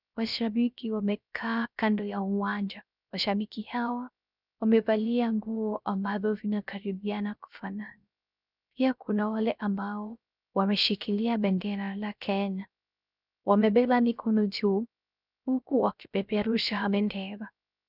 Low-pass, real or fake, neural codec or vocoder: 5.4 kHz; fake; codec, 16 kHz, 0.3 kbps, FocalCodec